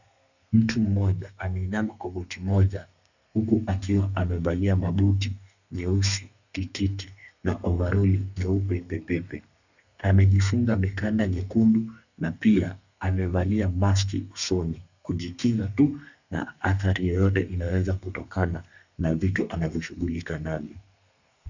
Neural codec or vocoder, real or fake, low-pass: codec, 32 kHz, 1.9 kbps, SNAC; fake; 7.2 kHz